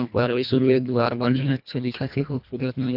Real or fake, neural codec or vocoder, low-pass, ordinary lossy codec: fake; codec, 24 kHz, 1.5 kbps, HILCodec; 5.4 kHz; none